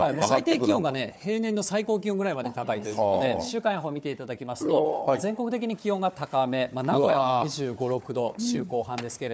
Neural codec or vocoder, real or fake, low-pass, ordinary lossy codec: codec, 16 kHz, 4 kbps, FunCodec, trained on Chinese and English, 50 frames a second; fake; none; none